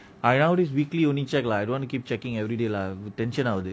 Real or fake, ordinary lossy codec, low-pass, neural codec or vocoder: real; none; none; none